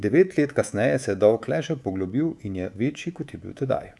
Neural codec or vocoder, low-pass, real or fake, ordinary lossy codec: codec, 24 kHz, 3.1 kbps, DualCodec; none; fake; none